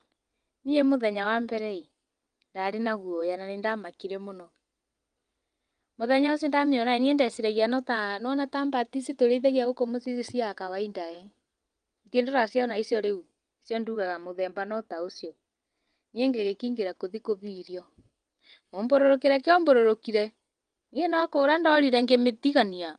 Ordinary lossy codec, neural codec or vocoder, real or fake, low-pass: Opus, 32 kbps; vocoder, 22.05 kHz, 80 mel bands, WaveNeXt; fake; 9.9 kHz